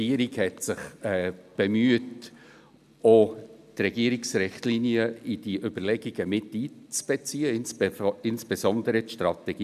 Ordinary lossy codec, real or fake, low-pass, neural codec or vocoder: none; real; 14.4 kHz; none